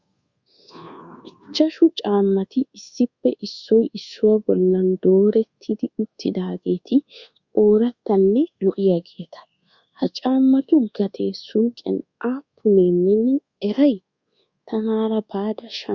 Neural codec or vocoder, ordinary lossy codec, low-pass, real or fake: codec, 24 kHz, 1.2 kbps, DualCodec; Opus, 64 kbps; 7.2 kHz; fake